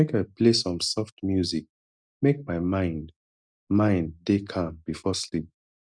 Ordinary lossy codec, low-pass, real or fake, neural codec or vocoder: none; 9.9 kHz; real; none